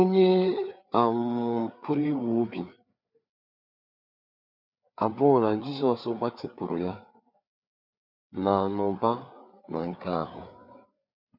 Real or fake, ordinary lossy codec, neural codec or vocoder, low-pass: fake; none; codec, 16 kHz, 4 kbps, FreqCodec, larger model; 5.4 kHz